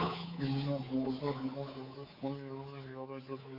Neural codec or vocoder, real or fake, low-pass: codec, 24 kHz, 3.1 kbps, DualCodec; fake; 5.4 kHz